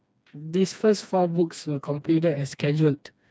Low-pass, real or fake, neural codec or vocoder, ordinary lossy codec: none; fake; codec, 16 kHz, 2 kbps, FreqCodec, smaller model; none